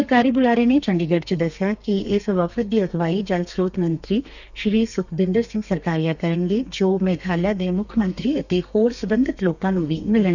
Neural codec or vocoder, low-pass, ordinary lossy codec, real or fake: codec, 32 kHz, 1.9 kbps, SNAC; 7.2 kHz; none; fake